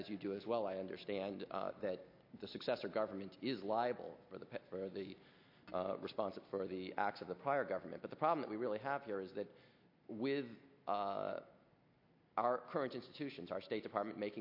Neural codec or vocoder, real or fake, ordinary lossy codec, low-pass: none; real; MP3, 32 kbps; 5.4 kHz